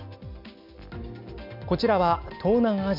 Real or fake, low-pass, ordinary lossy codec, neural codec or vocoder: real; 5.4 kHz; none; none